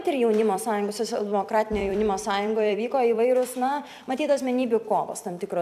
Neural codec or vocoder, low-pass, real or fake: vocoder, 44.1 kHz, 128 mel bands every 256 samples, BigVGAN v2; 14.4 kHz; fake